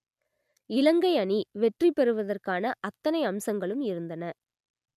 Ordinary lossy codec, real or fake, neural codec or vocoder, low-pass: none; real; none; 14.4 kHz